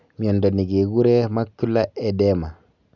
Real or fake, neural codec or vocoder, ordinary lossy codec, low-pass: real; none; none; 7.2 kHz